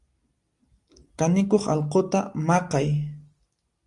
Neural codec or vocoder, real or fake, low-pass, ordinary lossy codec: none; real; 10.8 kHz; Opus, 32 kbps